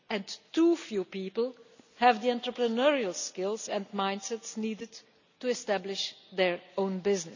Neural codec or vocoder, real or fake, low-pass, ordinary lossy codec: none; real; 7.2 kHz; none